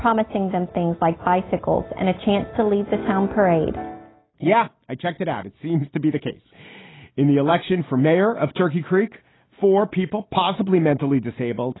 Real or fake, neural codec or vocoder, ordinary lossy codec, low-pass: real; none; AAC, 16 kbps; 7.2 kHz